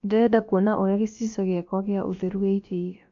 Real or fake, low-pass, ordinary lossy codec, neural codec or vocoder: fake; 7.2 kHz; MP3, 48 kbps; codec, 16 kHz, about 1 kbps, DyCAST, with the encoder's durations